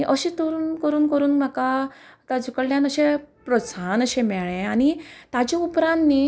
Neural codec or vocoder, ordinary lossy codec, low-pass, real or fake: none; none; none; real